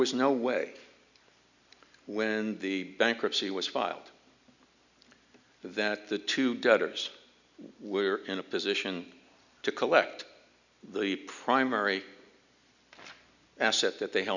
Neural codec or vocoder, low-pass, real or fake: none; 7.2 kHz; real